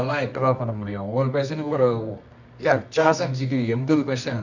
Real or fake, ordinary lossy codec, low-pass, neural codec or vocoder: fake; none; 7.2 kHz; codec, 24 kHz, 0.9 kbps, WavTokenizer, medium music audio release